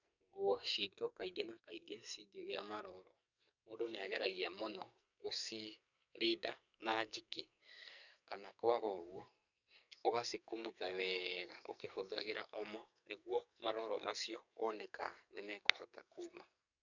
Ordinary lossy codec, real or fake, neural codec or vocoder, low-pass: none; fake; codec, 44.1 kHz, 2.6 kbps, SNAC; 7.2 kHz